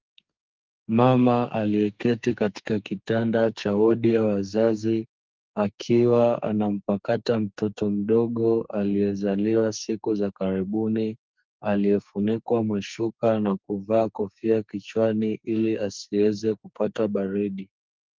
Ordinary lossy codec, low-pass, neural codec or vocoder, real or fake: Opus, 32 kbps; 7.2 kHz; codec, 44.1 kHz, 2.6 kbps, SNAC; fake